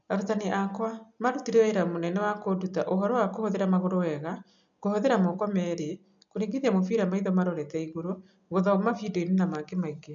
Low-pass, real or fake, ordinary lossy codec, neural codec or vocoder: 7.2 kHz; real; none; none